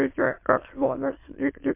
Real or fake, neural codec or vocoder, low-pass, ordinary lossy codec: fake; autoencoder, 22.05 kHz, a latent of 192 numbers a frame, VITS, trained on many speakers; 3.6 kHz; MP3, 24 kbps